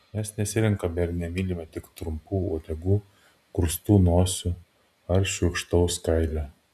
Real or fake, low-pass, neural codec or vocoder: real; 14.4 kHz; none